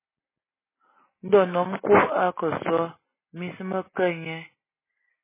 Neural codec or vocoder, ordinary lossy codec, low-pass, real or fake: none; MP3, 16 kbps; 3.6 kHz; real